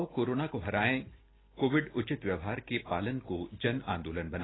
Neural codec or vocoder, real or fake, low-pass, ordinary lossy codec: none; real; 7.2 kHz; AAC, 16 kbps